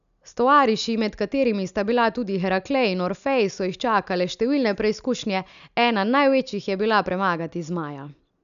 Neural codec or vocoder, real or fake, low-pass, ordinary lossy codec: none; real; 7.2 kHz; none